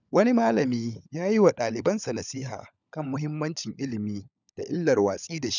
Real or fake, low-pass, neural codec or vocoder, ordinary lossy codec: fake; 7.2 kHz; codec, 16 kHz, 16 kbps, FunCodec, trained on LibriTTS, 50 frames a second; none